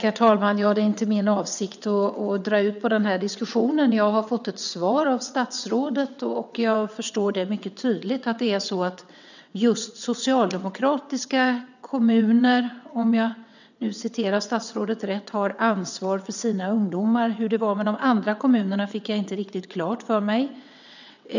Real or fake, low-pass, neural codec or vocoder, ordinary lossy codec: fake; 7.2 kHz; vocoder, 22.05 kHz, 80 mel bands, WaveNeXt; none